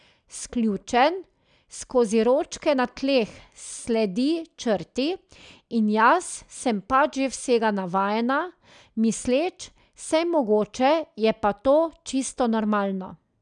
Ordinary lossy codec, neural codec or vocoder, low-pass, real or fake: none; none; 9.9 kHz; real